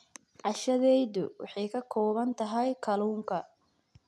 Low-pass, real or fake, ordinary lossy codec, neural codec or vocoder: none; real; none; none